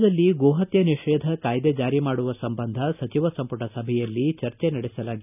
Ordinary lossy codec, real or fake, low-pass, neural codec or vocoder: none; real; 3.6 kHz; none